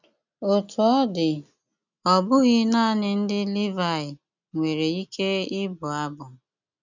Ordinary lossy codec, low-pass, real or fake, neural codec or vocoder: none; 7.2 kHz; real; none